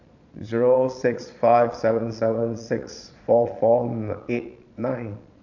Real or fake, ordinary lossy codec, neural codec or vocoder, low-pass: fake; none; vocoder, 22.05 kHz, 80 mel bands, Vocos; 7.2 kHz